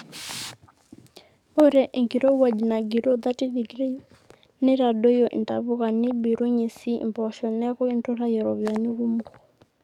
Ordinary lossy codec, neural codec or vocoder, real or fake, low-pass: none; codec, 44.1 kHz, 7.8 kbps, DAC; fake; 19.8 kHz